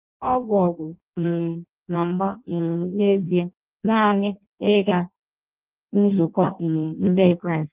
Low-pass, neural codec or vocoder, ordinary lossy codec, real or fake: 3.6 kHz; codec, 16 kHz in and 24 kHz out, 0.6 kbps, FireRedTTS-2 codec; Opus, 32 kbps; fake